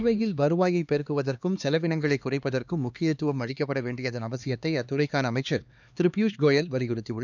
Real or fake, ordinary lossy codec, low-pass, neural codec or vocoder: fake; none; 7.2 kHz; codec, 16 kHz, 2 kbps, X-Codec, HuBERT features, trained on LibriSpeech